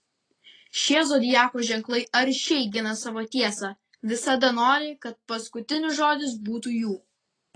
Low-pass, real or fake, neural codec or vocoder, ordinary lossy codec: 9.9 kHz; real; none; AAC, 32 kbps